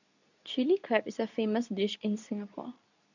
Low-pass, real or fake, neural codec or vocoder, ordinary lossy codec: 7.2 kHz; fake; codec, 24 kHz, 0.9 kbps, WavTokenizer, medium speech release version 2; none